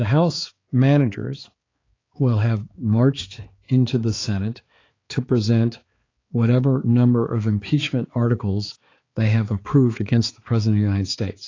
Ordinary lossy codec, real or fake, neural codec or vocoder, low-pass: AAC, 32 kbps; fake; codec, 16 kHz, 4 kbps, X-Codec, HuBERT features, trained on balanced general audio; 7.2 kHz